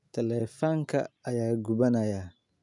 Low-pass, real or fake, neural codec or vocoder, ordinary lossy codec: 10.8 kHz; real; none; MP3, 96 kbps